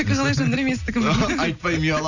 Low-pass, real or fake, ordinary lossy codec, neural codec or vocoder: 7.2 kHz; real; none; none